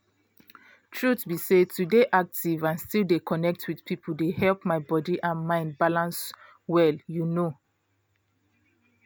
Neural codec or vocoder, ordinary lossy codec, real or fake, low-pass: none; none; real; none